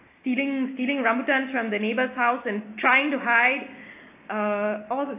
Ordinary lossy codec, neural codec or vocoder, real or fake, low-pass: AAC, 24 kbps; none; real; 3.6 kHz